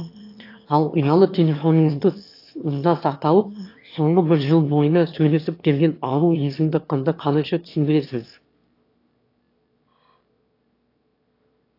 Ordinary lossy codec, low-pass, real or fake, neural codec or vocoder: AAC, 32 kbps; 5.4 kHz; fake; autoencoder, 22.05 kHz, a latent of 192 numbers a frame, VITS, trained on one speaker